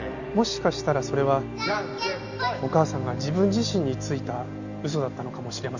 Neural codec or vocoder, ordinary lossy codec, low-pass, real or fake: none; none; 7.2 kHz; real